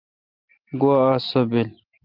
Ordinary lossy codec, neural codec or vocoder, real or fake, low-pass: Opus, 32 kbps; none; real; 5.4 kHz